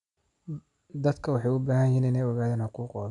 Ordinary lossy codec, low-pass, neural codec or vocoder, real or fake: none; 10.8 kHz; none; real